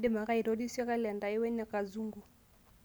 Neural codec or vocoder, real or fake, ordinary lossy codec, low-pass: none; real; none; none